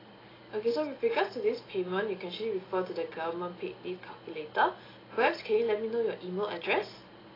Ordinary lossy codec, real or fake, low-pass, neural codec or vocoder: AAC, 24 kbps; real; 5.4 kHz; none